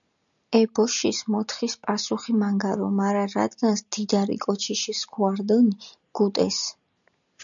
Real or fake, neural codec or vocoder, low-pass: real; none; 7.2 kHz